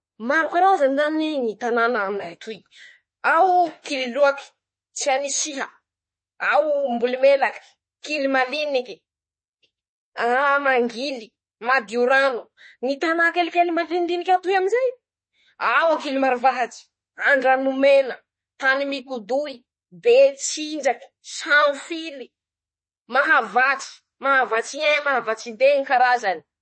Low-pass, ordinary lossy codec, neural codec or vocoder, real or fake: 9.9 kHz; MP3, 32 kbps; autoencoder, 48 kHz, 32 numbers a frame, DAC-VAE, trained on Japanese speech; fake